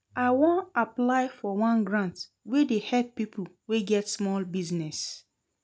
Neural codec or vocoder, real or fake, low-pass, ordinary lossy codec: none; real; none; none